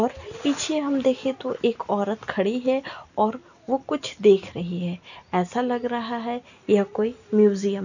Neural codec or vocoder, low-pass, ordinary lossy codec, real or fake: none; 7.2 kHz; none; real